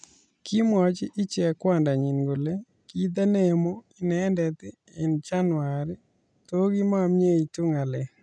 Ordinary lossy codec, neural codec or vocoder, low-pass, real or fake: none; none; 9.9 kHz; real